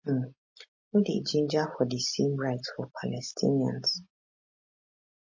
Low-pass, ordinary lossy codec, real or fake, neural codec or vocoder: 7.2 kHz; MP3, 32 kbps; real; none